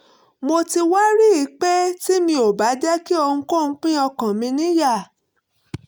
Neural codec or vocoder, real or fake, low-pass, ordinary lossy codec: none; real; none; none